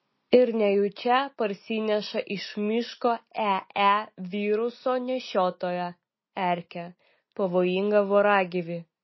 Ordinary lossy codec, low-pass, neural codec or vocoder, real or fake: MP3, 24 kbps; 7.2 kHz; none; real